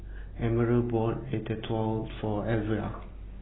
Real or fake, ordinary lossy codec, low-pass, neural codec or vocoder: real; AAC, 16 kbps; 7.2 kHz; none